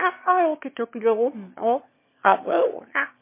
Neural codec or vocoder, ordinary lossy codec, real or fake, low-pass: autoencoder, 22.05 kHz, a latent of 192 numbers a frame, VITS, trained on one speaker; MP3, 24 kbps; fake; 3.6 kHz